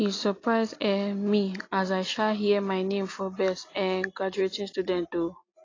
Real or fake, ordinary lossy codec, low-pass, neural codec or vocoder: real; AAC, 32 kbps; 7.2 kHz; none